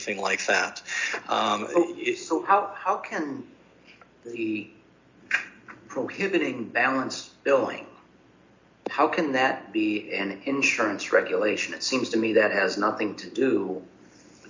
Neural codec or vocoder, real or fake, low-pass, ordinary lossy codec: none; real; 7.2 kHz; MP3, 48 kbps